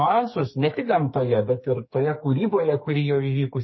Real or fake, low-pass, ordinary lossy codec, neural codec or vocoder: fake; 7.2 kHz; MP3, 24 kbps; codec, 44.1 kHz, 2.6 kbps, SNAC